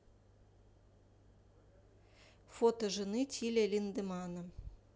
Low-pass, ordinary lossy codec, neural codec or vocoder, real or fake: none; none; none; real